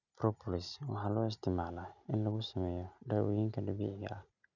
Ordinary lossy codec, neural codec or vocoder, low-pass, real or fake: none; none; 7.2 kHz; real